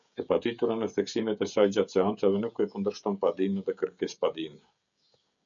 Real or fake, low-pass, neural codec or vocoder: fake; 7.2 kHz; codec, 16 kHz, 16 kbps, FreqCodec, smaller model